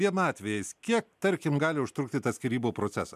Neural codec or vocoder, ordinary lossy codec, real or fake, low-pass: none; MP3, 96 kbps; real; 14.4 kHz